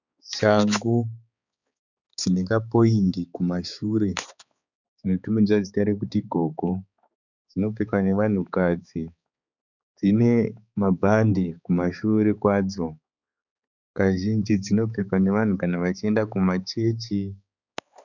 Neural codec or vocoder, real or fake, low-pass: codec, 16 kHz, 4 kbps, X-Codec, HuBERT features, trained on balanced general audio; fake; 7.2 kHz